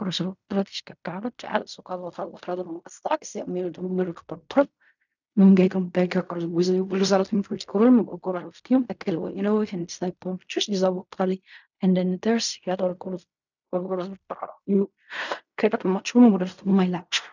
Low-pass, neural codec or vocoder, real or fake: 7.2 kHz; codec, 16 kHz in and 24 kHz out, 0.4 kbps, LongCat-Audio-Codec, fine tuned four codebook decoder; fake